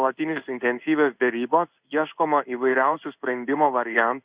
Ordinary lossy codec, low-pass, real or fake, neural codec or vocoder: Opus, 64 kbps; 3.6 kHz; fake; codec, 16 kHz in and 24 kHz out, 1 kbps, XY-Tokenizer